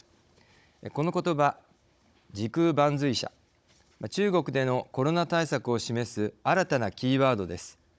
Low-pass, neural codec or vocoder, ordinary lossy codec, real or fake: none; codec, 16 kHz, 16 kbps, FunCodec, trained on Chinese and English, 50 frames a second; none; fake